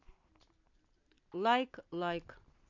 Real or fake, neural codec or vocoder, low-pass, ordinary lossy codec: real; none; 7.2 kHz; AAC, 48 kbps